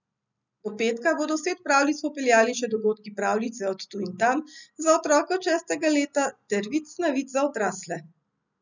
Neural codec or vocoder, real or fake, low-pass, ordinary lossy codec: none; real; 7.2 kHz; none